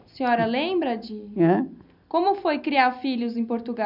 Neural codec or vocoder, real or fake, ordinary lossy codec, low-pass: none; real; none; 5.4 kHz